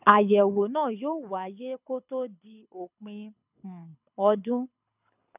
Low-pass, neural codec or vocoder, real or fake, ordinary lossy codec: 3.6 kHz; codec, 24 kHz, 6 kbps, HILCodec; fake; none